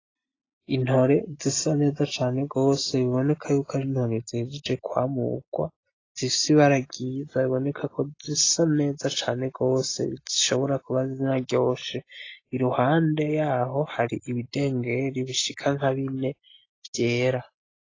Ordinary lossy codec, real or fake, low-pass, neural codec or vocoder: AAC, 32 kbps; real; 7.2 kHz; none